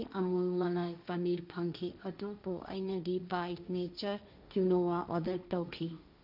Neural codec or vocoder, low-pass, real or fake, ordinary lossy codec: codec, 16 kHz, 1.1 kbps, Voila-Tokenizer; 5.4 kHz; fake; none